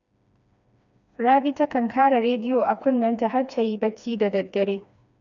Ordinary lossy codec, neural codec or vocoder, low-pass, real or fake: none; codec, 16 kHz, 2 kbps, FreqCodec, smaller model; 7.2 kHz; fake